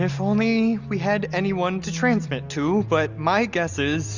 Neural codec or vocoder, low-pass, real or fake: none; 7.2 kHz; real